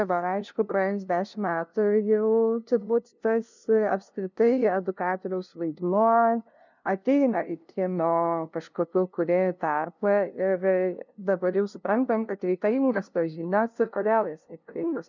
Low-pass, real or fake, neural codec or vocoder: 7.2 kHz; fake; codec, 16 kHz, 0.5 kbps, FunCodec, trained on LibriTTS, 25 frames a second